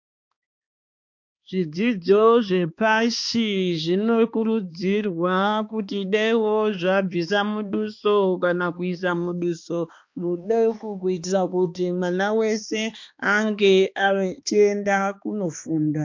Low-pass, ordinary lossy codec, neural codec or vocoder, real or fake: 7.2 kHz; MP3, 48 kbps; codec, 16 kHz, 2 kbps, X-Codec, HuBERT features, trained on balanced general audio; fake